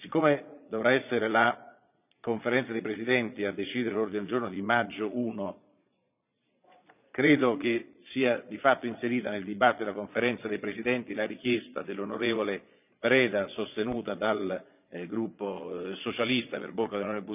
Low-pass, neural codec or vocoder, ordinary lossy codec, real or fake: 3.6 kHz; vocoder, 22.05 kHz, 80 mel bands, Vocos; none; fake